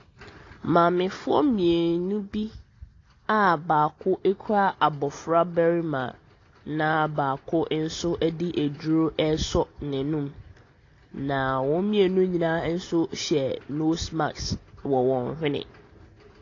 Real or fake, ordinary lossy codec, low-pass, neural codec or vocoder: real; AAC, 32 kbps; 7.2 kHz; none